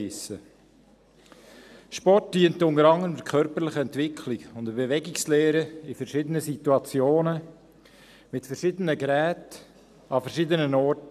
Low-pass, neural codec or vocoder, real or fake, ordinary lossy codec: 14.4 kHz; none; real; none